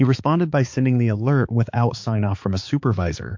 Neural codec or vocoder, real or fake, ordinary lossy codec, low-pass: codec, 16 kHz, 4 kbps, X-Codec, HuBERT features, trained on balanced general audio; fake; MP3, 48 kbps; 7.2 kHz